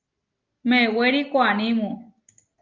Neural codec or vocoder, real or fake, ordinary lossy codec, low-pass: none; real; Opus, 32 kbps; 7.2 kHz